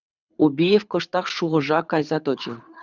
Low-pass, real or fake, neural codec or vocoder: 7.2 kHz; fake; codec, 24 kHz, 6 kbps, HILCodec